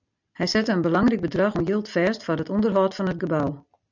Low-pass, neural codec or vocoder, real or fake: 7.2 kHz; none; real